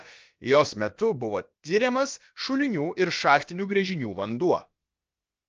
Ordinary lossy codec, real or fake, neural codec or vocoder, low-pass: Opus, 24 kbps; fake; codec, 16 kHz, about 1 kbps, DyCAST, with the encoder's durations; 7.2 kHz